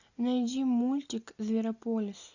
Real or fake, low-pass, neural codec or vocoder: real; 7.2 kHz; none